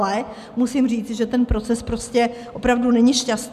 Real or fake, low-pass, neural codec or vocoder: real; 14.4 kHz; none